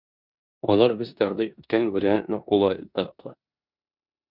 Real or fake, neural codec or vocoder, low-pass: fake; codec, 16 kHz in and 24 kHz out, 0.9 kbps, LongCat-Audio-Codec, four codebook decoder; 5.4 kHz